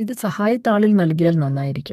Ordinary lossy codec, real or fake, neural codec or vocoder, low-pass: none; fake; codec, 32 kHz, 1.9 kbps, SNAC; 14.4 kHz